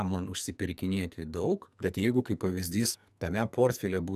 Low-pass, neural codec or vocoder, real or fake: 14.4 kHz; codec, 44.1 kHz, 2.6 kbps, SNAC; fake